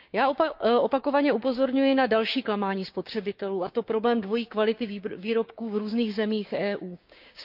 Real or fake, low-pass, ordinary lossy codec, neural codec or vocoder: fake; 5.4 kHz; none; codec, 16 kHz, 6 kbps, DAC